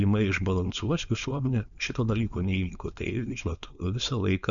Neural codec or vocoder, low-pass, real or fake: codec, 16 kHz, 4.8 kbps, FACodec; 7.2 kHz; fake